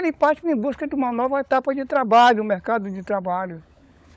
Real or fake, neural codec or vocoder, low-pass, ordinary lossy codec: fake; codec, 16 kHz, 16 kbps, FunCodec, trained on LibriTTS, 50 frames a second; none; none